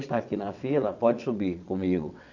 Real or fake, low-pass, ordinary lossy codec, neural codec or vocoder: fake; 7.2 kHz; none; vocoder, 22.05 kHz, 80 mel bands, WaveNeXt